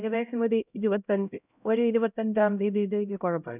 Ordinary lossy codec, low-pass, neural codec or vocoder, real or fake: none; 3.6 kHz; codec, 16 kHz, 0.5 kbps, X-Codec, HuBERT features, trained on LibriSpeech; fake